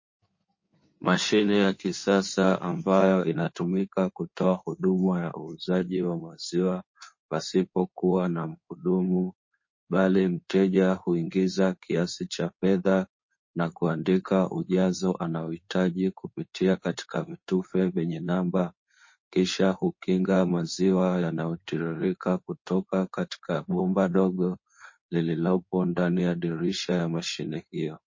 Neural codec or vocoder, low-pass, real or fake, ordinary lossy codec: codec, 16 kHz in and 24 kHz out, 2.2 kbps, FireRedTTS-2 codec; 7.2 kHz; fake; MP3, 32 kbps